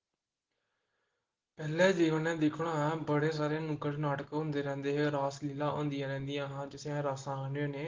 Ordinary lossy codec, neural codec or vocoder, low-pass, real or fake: Opus, 16 kbps; none; 7.2 kHz; real